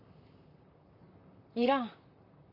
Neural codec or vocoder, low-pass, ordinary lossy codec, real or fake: vocoder, 44.1 kHz, 128 mel bands, Pupu-Vocoder; 5.4 kHz; none; fake